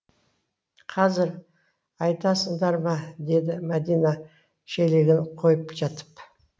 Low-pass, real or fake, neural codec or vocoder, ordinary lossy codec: none; real; none; none